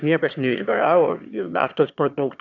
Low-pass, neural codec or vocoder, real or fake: 7.2 kHz; autoencoder, 22.05 kHz, a latent of 192 numbers a frame, VITS, trained on one speaker; fake